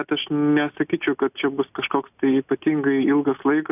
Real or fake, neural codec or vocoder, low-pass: real; none; 3.6 kHz